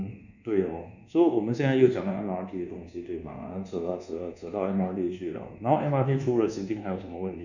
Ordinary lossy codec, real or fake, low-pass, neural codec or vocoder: none; fake; 7.2 kHz; codec, 24 kHz, 1.2 kbps, DualCodec